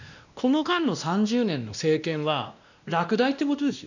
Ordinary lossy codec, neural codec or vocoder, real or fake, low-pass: none; codec, 16 kHz, 1 kbps, X-Codec, WavLM features, trained on Multilingual LibriSpeech; fake; 7.2 kHz